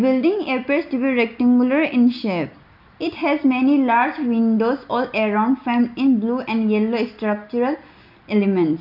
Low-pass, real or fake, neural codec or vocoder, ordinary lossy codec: 5.4 kHz; real; none; none